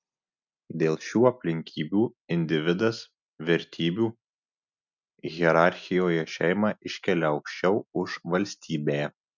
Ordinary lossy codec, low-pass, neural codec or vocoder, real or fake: MP3, 64 kbps; 7.2 kHz; none; real